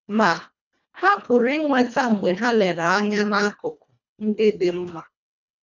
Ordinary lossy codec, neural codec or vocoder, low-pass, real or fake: none; codec, 24 kHz, 1.5 kbps, HILCodec; 7.2 kHz; fake